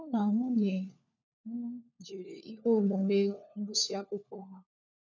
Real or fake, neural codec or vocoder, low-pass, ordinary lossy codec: fake; codec, 16 kHz, 4 kbps, FunCodec, trained on LibriTTS, 50 frames a second; 7.2 kHz; none